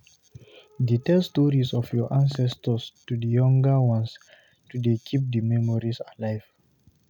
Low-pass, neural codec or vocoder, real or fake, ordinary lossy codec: 19.8 kHz; none; real; none